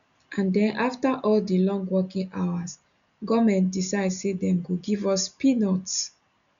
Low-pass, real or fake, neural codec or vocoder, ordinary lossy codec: 7.2 kHz; real; none; none